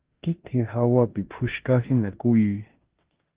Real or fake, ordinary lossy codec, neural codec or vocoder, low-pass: fake; Opus, 16 kbps; codec, 16 kHz in and 24 kHz out, 0.9 kbps, LongCat-Audio-Codec, four codebook decoder; 3.6 kHz